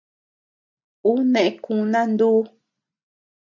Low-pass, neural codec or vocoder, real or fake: 7.2 kHz; none; real